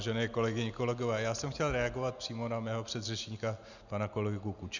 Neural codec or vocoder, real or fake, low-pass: none; real; 7.2 kHz